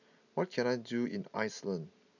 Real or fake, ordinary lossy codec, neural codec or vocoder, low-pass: real; none; none; 7.2 kHz